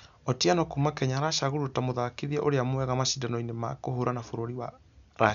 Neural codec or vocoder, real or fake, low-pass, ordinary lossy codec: none; real; 7.2 kHz; none